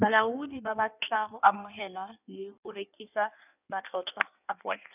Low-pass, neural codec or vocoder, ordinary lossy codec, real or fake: 3.6 kHz; codec, 16 kHz in and 24 kHz out, 1.1 kbps, FireRedTTS-2 codec; none; fake